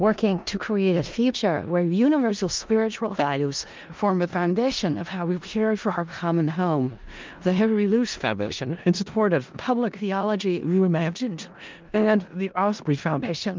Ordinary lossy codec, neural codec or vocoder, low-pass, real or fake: Opus, 24 kbps; codec, 16 kHz in and 24 kHz out, 0.4 kbps, LongCat-Audio-Codec, four codebook decoder; 7.2 kHz; fake